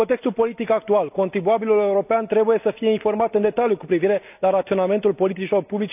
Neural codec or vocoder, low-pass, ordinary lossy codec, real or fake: none; 3.6 kHz; none; real